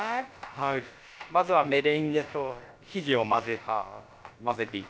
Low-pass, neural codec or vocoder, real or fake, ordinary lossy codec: none; codec, 16 kHz, about 1 kbps, DyCAST, with the encoder's durations; fake; none